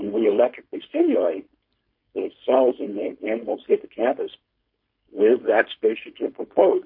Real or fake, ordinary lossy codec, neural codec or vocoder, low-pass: fake; MP3, 32 kbps; codec, 16 kHz, 4.8 kbps, FACodec; 5.4 kHz